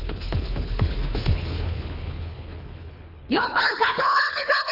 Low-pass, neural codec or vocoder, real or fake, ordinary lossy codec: 5.4 kHz; codec, 24 kHz, 3 kbps, HILCodec; fake; none